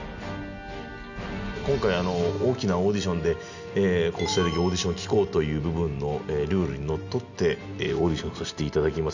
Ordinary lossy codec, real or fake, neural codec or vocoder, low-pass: none; real; none; 7.2 kHz